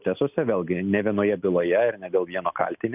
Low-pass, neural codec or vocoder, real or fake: 3.6 kHz; none; real